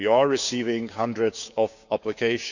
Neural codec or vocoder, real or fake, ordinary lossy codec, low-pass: codec, 16 kHz, 6 kbps, DAC; fake; none; 7.2 kHz